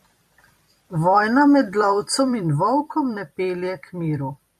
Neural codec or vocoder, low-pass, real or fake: vocoder, 44.1 kHz, 128 mel bands every 256 samples, BigVGAN v2; 14.4 kHz; fake